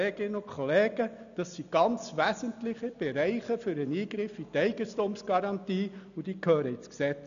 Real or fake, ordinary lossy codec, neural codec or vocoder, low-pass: real; none; none; 7.2 kHz